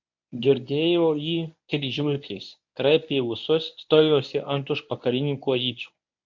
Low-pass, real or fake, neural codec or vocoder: 7.2 kHz; fake; codec, 24 kHz, 0.9 kbps, WavTokenizer, medium speech release version 1